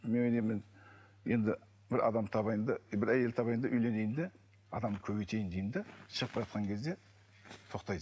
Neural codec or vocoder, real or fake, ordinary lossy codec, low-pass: none; real; none; none